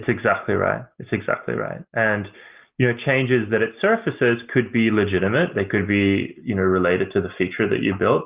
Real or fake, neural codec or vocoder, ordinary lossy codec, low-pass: real; none; Opus, 24 kbps; 3.6 kHz